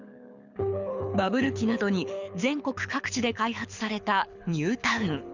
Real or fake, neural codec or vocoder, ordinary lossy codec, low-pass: fake; codec, 24 kHz, 6 kbps, HILCodec; none; 7.2 kHz